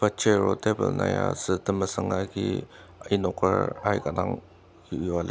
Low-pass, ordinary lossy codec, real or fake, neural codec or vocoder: none; none; real; none